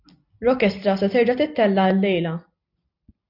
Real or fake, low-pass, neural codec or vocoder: real; 5.4 kHz; none